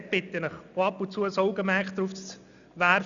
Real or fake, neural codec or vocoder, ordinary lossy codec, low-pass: real; none; none; 7.2 kHz